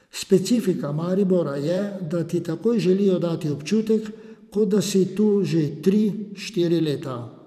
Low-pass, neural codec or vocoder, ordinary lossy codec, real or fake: 14.4 kHz; vocoder, 44.1 kHz, 128 mel bands every 512 samples, BigVGAN v2; none; fake